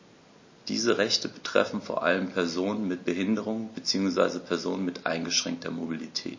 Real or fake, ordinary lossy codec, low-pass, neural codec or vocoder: real; MP3, 32 kbps; 7.2 kHz; none